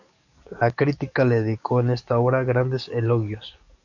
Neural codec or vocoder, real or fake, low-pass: codec, 44.1 kHz, 7.8 kbps, DAC; fake; 7.2 kHz